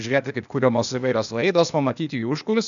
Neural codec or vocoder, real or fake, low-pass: codec, 16 kHz, 0.8 kbps, ZipCodec; fake; 7.2 kHz